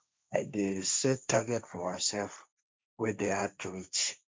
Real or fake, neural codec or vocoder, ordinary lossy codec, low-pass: fake; codec, 16 kHz, 1.1 kbps, Voila-Tokenizer; none; none